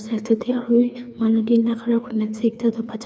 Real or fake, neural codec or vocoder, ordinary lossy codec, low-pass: fake; codec, 16 kHz, 2 kbps, FreqCodec, larger model; none; none